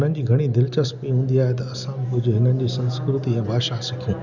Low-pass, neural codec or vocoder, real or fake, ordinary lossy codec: 7.2 kHz; none; real; none